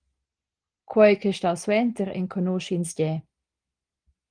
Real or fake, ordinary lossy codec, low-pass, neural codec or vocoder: real; Opus, 24 kbps; 9.9 kHz; none